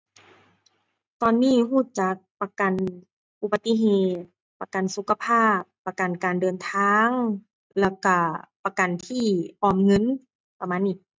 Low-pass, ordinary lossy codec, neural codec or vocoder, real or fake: none; none; none; real